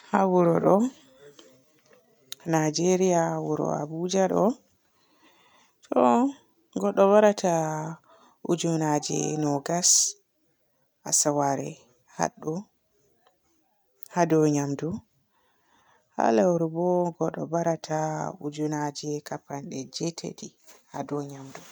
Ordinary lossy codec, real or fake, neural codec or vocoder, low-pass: none; real; none; none